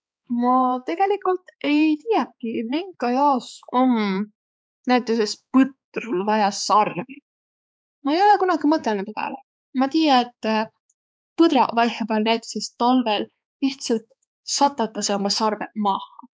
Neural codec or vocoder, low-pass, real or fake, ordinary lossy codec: codec, 16 kHz, 4 kbps, X-Codec, HuBERT features, trained on balanced general audio; none; fake; none